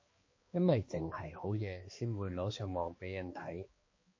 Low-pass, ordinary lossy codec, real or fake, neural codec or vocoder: 7.2 kHz; MP3, 32 kbps; fake; codec, 16 kHz, 2 kbps, X-Codec, HuBERT features, trained on balanced general audio